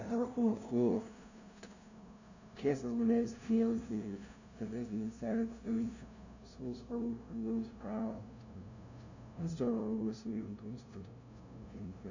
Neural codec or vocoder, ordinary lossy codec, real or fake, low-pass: codec, 16 kHz, 0.5 kbps, FunCodec, trained on LibriTTS, 25 frames a second; none; fake; 7.2 kHz